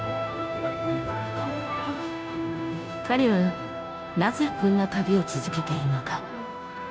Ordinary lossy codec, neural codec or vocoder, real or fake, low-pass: none; codec, 16 kHz, 0.5 kbps, FunCodec, trained on Chinese and English, 25 frames a second; fake; none